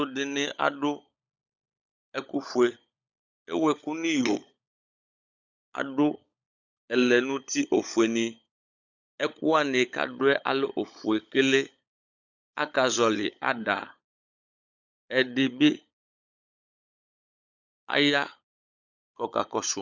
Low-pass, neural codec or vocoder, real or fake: 7.2 kHz; codec, 16 kHz, 16 kbps, FunCodec, trained on LibriTTS, 50 frames a second; fake